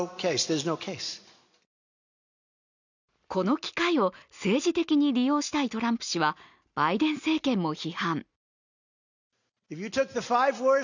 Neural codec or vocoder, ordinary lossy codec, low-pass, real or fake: none; none; 7.2 kHz; real